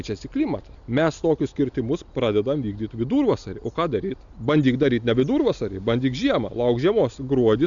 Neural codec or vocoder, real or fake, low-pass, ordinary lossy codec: none; real; 7.2 kHz; MP3, 96 kbps